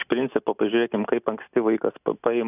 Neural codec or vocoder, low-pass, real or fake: none; 3.6 kHz; real